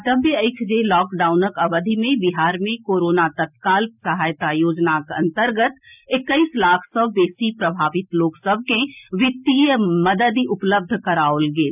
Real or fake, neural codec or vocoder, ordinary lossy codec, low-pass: real; none; none; 3.6 kHz